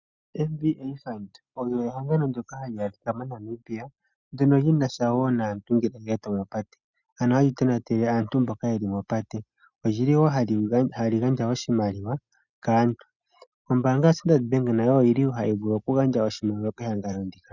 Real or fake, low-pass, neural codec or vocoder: real; 7.2 kHz; none